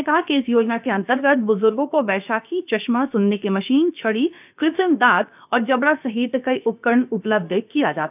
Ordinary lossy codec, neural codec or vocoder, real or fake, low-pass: none; codec, 16 kHz, about 1 kbps, DyCAST, with the encoder's durations; fake; 3.6 kHz